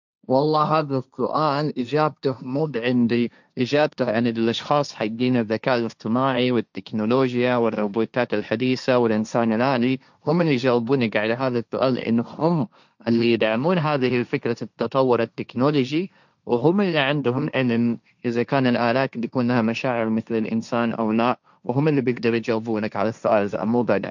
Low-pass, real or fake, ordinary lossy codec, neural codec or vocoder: 7.2 kHz; fake; none; codec, 16 kHz, 1.1 kbps, Voila-Tokenizer